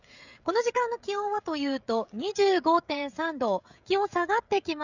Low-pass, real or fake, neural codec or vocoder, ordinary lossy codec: 7.2 kHz; fake; codec, 16 kHz, 16 kbps, FreqCodec, smaller model; none